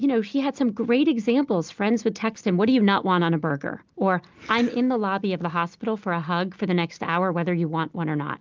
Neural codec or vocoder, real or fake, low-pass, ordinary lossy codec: none; real; 7.2 kHz; Opus, 24 kbps